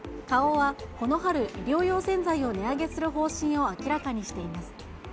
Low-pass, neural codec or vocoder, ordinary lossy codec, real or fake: none; none; none; real